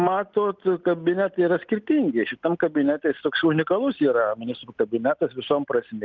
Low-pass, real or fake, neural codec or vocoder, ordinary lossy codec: 7.2 kHz; real; none; Opus, 32 kbps